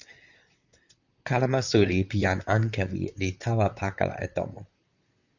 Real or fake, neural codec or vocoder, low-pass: fake; vocoder, 44.1 kHz, 128 mel bands, Pupu-Vocoder; 7.2 kHz